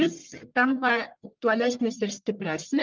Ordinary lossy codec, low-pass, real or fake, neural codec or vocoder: Opus, 32 kbps; 7.2 kHz; fake; codec, 44.1 kHz, 1.7 kbps, Pupu-Codec